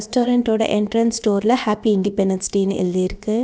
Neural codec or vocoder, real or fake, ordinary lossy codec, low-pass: codec, 16 kHz, about 1 kbps, DyCAST, with the encoder's durations; fake; none; none